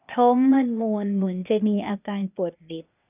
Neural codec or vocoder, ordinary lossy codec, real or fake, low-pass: codec, 16 kHz, 0.8 kbps, ZipCodec; none; fake; 3.6 kHz